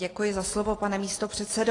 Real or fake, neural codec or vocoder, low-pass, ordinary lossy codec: real; none; 10.8 kHz; AAC, 32 kbps